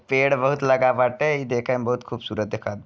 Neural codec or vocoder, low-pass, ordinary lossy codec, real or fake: none; none; none; real